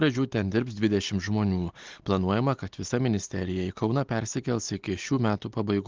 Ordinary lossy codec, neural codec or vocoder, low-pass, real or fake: Opus, 16 kbps; none; 7.2 kHz; real